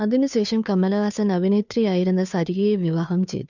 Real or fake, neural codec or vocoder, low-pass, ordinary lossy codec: fake; codec, 16 kHz, 2 kbps, FunCodec, trained on Chinese and English, 25 frames a second; 7.2 kHz; none